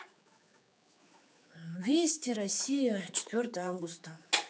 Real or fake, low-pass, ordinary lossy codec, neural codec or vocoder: fake; none; none; codec, 16 kHz, 4 kbps, X-Codec, HuBERT features, trained on general audio